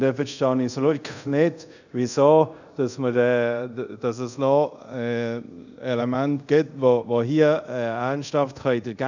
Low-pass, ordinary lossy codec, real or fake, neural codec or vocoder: 7.2 kHz; none; fake; codec, 24 kHz, 0.5 kbps, DualCodec